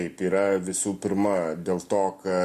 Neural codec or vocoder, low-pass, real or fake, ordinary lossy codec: none; 14.4 kHz; real; MP3, 64 kbps